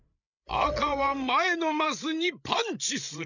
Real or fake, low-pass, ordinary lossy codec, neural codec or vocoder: fake; 7.2 kHz; none; codec, 16 kHz, 8 kbps, FreqCodec, larger model